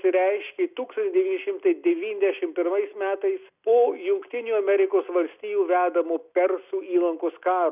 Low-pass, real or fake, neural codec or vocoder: 3.6 kHz; real; none